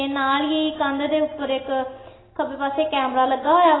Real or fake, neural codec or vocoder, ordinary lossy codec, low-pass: real; none; AAC, 16 kbps; 7.2 kHz